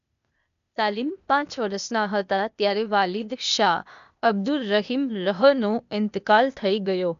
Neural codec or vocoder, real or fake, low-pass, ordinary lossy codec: codec, 16 kHz, 0.8 kbps, ZipCodec; fake; 7.2 kHz; none